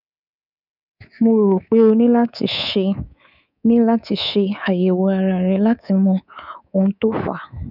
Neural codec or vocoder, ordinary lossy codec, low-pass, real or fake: codec, 24 kHz, 3.1 kbps, DualCodec; none; 5.4 kHz; fake